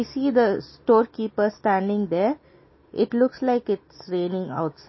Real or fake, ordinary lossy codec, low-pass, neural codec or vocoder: real; MP3, 24 kbps; 7.2 kHz; none